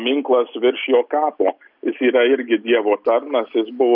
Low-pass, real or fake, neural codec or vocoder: 5.4 kHz; real; none